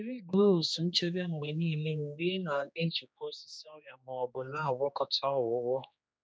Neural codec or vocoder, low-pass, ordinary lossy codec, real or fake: codec, 16 kHz, 2 kbps, X-Codec, HuBERT features, trained on general audio; none; none; fake